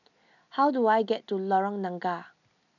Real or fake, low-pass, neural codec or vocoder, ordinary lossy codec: real; 7.2 kHz; none; none